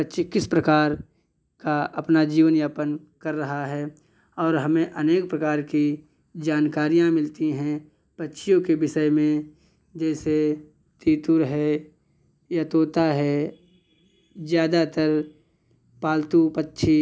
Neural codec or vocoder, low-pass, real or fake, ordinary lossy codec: none; none; real; none